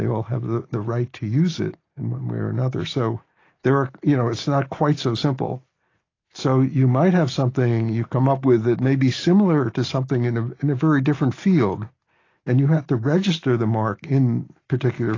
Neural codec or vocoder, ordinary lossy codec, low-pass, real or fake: none; AAC, 32 kbps; 7.2 kHz; real